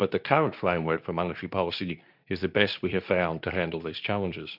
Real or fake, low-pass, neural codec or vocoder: fake; 5.4 kHz; codec, 24 kHz, 0.9 kbps, WavTokenizer, medium speech release version 2